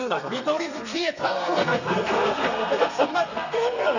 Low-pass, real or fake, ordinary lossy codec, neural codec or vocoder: 7.2 kHz; fake; none; codec, 32 kHz, 1.9 kbps, SNAC